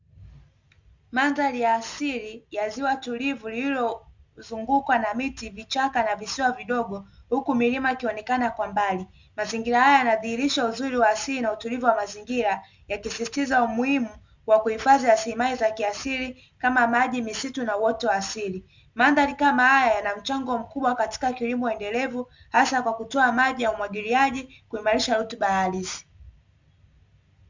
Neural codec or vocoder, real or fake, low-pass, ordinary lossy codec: none; real; 7.2 kHz; Opus, 64 kbps